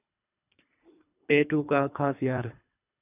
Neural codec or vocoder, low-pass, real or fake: codec, 24 kHz, 3 kbps, HILCodec; 3.6 kHz; fake